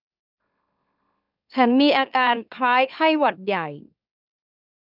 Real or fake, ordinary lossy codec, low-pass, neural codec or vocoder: fake; none; 5.4 kHz; autoencoder, 44.1 kHz, a latent of 192 numbers a frame, MeloTTS